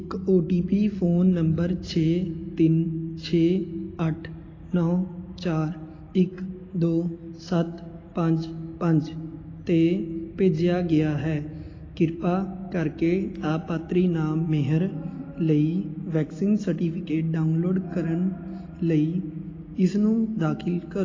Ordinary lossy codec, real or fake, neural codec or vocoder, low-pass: AAC, 32 kbps; real; none; 7.2 kHz